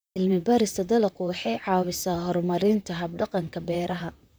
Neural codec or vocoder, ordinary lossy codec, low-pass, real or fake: vocoder, 44.1 kHz, 128 mel bands, Pupu-Vocoder; none; none; fake